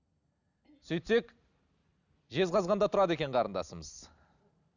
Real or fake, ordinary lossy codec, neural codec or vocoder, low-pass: real; none; none; 7.2 kHz